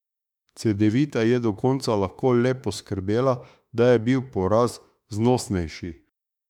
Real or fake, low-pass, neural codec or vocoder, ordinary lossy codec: fake; 19.8 kHz; autoencoder, 48 kHz, 32 numbers a frame, DAC-VAE, trained on Japanese speech; none